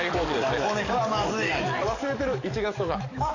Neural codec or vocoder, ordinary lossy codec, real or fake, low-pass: codec, 44.1 kHz, 7.8 kbps, DAC; none; fake; 7.2 kHz